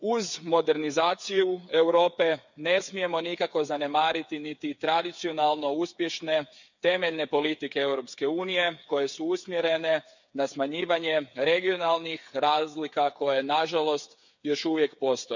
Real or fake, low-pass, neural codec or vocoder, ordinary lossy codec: fake; 7.2 kHz; codec, 16 kHz, 8 kbps, FreqCodec, smaller model; none